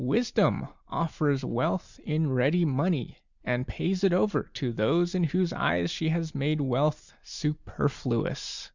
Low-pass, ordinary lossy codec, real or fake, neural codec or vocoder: 7.2 kHz; Opus, 64 kbps; real; none